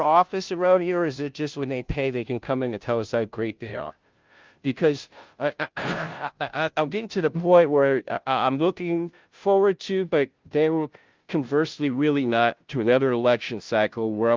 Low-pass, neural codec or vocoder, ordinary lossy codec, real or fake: 7.2 kHz; codec, 16 kHz, 0.5 kbps, FunCodec, trained on Chinese and English, 25 frames a second; Opus, 24 kbps; fake